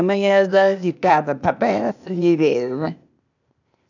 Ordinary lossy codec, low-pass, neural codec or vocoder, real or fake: none; 7.2 kHz; codec, 16 kHz, 0.8 kbps, ZipCodec; fake